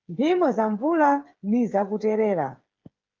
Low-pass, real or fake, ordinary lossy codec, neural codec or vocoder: 7.2 kHz; fake; Opus, 32 kbps; codec, 16 kHz, 8 kbps, FreqCodec, smaller model